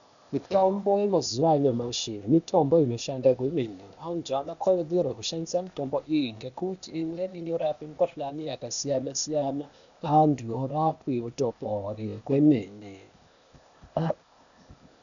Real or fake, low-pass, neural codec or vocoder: fake; 7.2 kHz; codec, 16 kHz, 0.8 kbps, ZipCodec